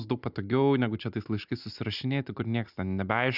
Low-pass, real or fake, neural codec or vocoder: 5.4 kHz; real; none